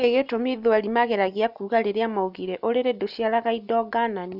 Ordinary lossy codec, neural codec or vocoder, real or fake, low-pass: none; none; real; 5.4 kHz